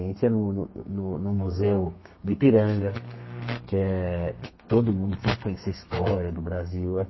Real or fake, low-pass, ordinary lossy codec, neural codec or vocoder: fake; 7.2 kHz; MP3, 24 kbps; codec, 32 kHz, 1.9 kbps, SNAC